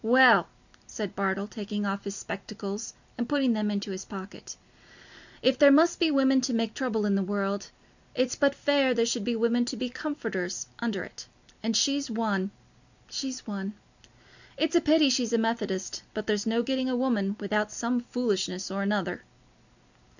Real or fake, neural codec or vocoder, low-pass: real; none; 7.2 kHz